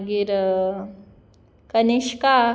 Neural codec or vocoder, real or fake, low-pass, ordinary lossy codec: none; real; none; none